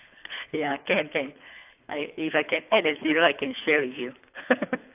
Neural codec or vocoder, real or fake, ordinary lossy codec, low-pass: codec, 24 kHz, 3 kbps, HILCodec; fake; AAC, 32 kbps; 3.6 kHz